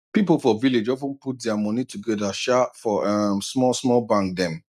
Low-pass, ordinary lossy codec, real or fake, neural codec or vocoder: 14.4 kHz; none; real; none